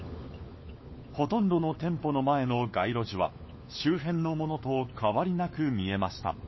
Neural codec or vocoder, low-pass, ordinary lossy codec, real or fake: codec, 16 kHz, 4 kbps, FunCodec, trained on LibriTTS, 50 frames a second; 7.2 kHz; MP3, 24 kbps; fake